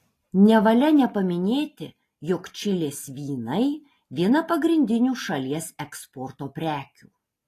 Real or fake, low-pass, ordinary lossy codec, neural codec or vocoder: real; 14.4 kHz; AAC, 48 kbps; none